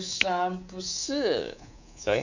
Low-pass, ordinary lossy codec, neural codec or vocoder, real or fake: 7.2 kHz; none; codec, 24 kHz, 3.1 kbps, DualCodec; fake